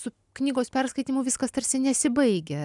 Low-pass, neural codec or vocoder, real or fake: 10.8 kHz; none; real